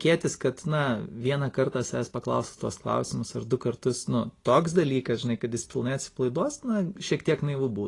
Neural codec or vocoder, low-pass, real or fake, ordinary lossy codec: none; 10.8 kHz; real; AAC, 32 kbps